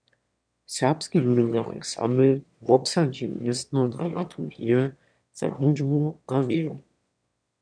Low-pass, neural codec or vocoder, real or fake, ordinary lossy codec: 9.9 kHz; autoencoder, 22.05 kHz, a latent of 192 numbers a frame, VITS, trained on one speaker; fake; MP3, 96 kbps